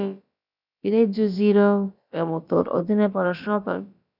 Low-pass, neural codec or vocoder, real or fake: 5.4 kHz; codec, 16 kHz, about 1 kbps, DyCAST, with the encoder's durations; fake